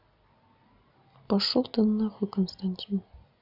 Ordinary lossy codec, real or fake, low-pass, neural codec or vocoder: none; real; 5.4 kHz; none